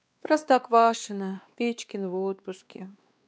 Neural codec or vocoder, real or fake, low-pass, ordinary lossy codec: codec, 16 kHz, 2 kbps, X-Codec, WavLM features, trained on Multilingual LibriSpeech; fake; none; none